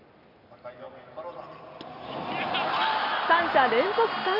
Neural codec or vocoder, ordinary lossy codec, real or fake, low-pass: none; MP3, 32 kbps; real; 5.4 kHz